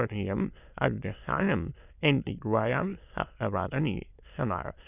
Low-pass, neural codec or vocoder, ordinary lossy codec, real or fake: 3.6 kHz; autoencoder, 22.05 kHz, a latent of 192 numbers a frame, VITS, trained on many speakers; none; fake